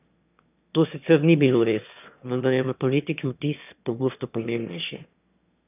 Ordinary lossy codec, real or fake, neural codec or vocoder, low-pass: none; fake; autoencoder, 22.05 kHz, a latent of 192 numbers a frame, VITS, trained on one speaker; 3.6 kHz